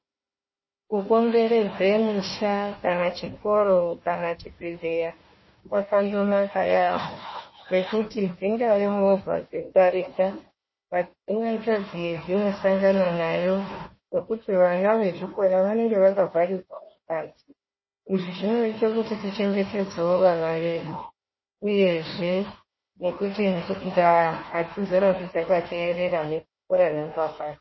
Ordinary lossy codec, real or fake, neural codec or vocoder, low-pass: MP3, 24 kbps; fake; codec, 16 kHz, 1 kbps, FunCodec, trained on Chinese and English, 50 frames a second; 7.2 kHz